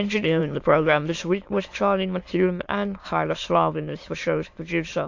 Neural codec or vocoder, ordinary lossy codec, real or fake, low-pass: autoencoder, 22.05 kHz, a latent of 192 numbers a frame, VITS, trained on many speakers; AAC, 48 kbps; fake; 7.2 kHz